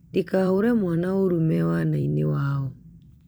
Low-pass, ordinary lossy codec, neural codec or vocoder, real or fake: none; none; vocoder, 44.1 kHz, 128 mel bands every 256 samples, BigVGAN v2; fake